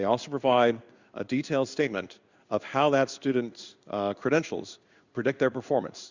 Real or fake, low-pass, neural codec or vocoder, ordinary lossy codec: fake; 7.2 kHz; codec, 16 kHz in and 24 kHz out, 1 kbps, XY-Tokenizer; Opus, 64 kbps